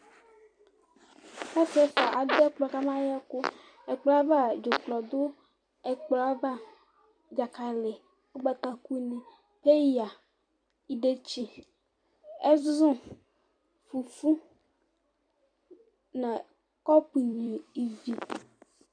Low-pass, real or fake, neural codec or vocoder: 9.9 kHz; fake; vocoder, 44.1 kHz, 128 mel bands every 256 samples, BigVGAN v2